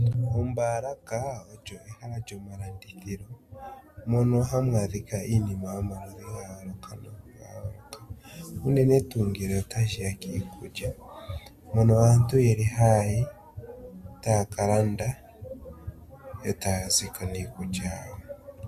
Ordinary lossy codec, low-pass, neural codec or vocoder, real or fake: Opus, 64 kbps; 14.4 kHz; none; real